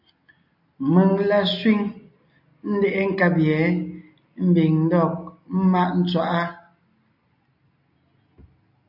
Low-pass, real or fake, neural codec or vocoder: 5.4 kHz; real; none